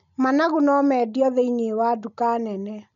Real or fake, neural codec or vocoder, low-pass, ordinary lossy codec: real; none; 7.2 kHz; none